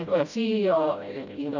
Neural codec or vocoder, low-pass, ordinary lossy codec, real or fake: codec, 16 kHz, 0.5 kbps, FreqCodec, smaller model; 7.2 kHz; none; fake